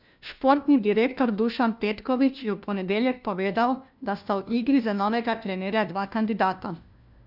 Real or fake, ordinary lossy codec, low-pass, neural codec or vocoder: fake; none; 5.4 kHz; codec, 16 kHz, 1 kbps, FunCodec, trained on LibriTTS, 50 frames a second